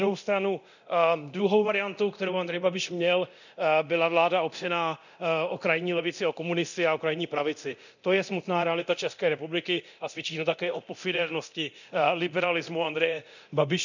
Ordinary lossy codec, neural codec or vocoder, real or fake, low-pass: none; codec, 24 kHz, 0.9 kbps, DualCodec; fake; 7.2 kHz